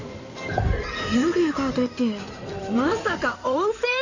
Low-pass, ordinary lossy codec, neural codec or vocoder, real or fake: 7.2 kHz; none; codec, 16 kHz in and 24 kHz out, 2.2 kbps, FireRedTTS-2 codec; fake